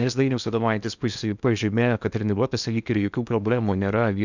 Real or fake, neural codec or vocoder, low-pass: fake; codec, 16 kHz in and 24 kHz out, 0.8 kbps, FocalCodec, streaming, 65536 codes; 7.2 kHz